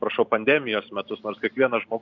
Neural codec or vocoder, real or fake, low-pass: vocoder, 44.1 kHz, 128 mel bands every 512 samples, BigVGAN v2; fake; 7.2 kHz